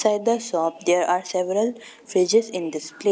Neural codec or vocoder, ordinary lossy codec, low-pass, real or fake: none; none; none; real